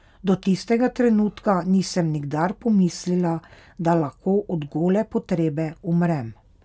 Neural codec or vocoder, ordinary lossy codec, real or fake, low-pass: none; none; real; none